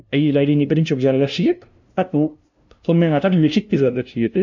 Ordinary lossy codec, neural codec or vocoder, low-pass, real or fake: none; codec, 16 kHz, 0.5 kbps, FunCodec, trained on LibriTTS, 25 frames a second; 7.2 kHz; fake